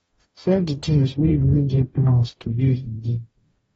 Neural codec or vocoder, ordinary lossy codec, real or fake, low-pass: codec, 44.1 kHz, 0.9 kbps, DAC; AAC, 24 kbps; fake; 19.8 kHz